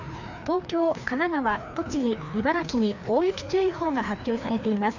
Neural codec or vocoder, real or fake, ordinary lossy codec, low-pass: codec, 16 kHz, 2 kbps, FreqCodec, larger model; fake; none; 7.2 kHz